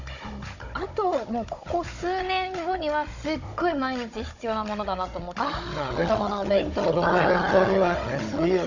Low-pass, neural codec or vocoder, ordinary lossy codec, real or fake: 7.2 kHz; codec, 16 kHz, 16 kbps, FunCodec, trained on Chinese and English, 50 frames a second; none; fake